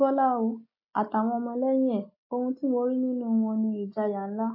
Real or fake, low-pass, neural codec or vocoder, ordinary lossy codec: real; 5.4 kHz; none; AAC, 32 kbps